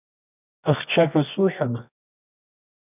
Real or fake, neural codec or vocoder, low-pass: fake; codec, 24 kHz, 0.9 kbps, WavTokenizer, medium music audio release; 3.6 kHz